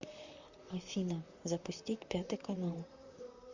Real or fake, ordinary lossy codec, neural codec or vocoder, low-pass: fake; Opus, 64 kbps; vocoder, 44.1 kHz, 128 mel bands, Pupu-Vocoder; 7.2 kHz